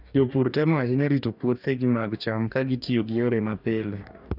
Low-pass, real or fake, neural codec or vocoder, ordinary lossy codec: 5.4 kHz; fake; codec, 44.1 kHz, 2.6 kbps, DAC; none